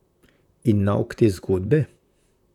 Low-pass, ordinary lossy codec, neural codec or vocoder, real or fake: 19.8 kHz; none; vocoder, 44.1 kHz, 128 mel bands, Pupu-Vocoder; fake